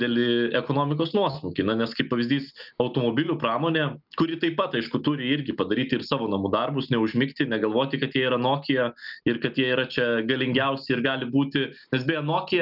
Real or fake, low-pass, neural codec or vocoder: real; 5.4 kHz; none